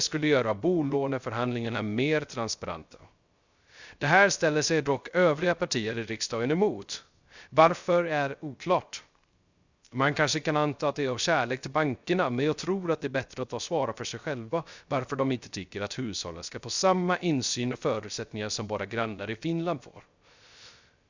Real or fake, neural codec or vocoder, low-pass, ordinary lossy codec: fake; codec, 16 kHz, 0.3 kbps, FocalCodec; 7.2 kHz; Opus, 64 kbps